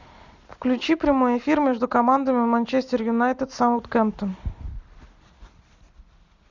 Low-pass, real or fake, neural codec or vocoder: 7.2 kHz; real; none